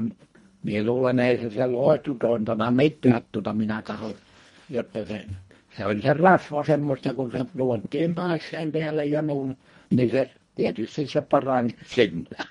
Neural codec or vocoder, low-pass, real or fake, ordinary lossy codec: codec, 24 kHz, 1.5 kbps, HILCodec; 10.8 kHz; fake; MP3, 48 kbps